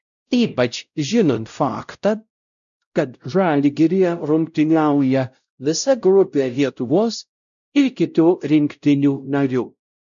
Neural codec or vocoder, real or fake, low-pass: codec, 16 kHz, 0.5 kbps, X-Codec, WavLM features, trained on Multilingual LibriSpeech; fake; 7.2 kHz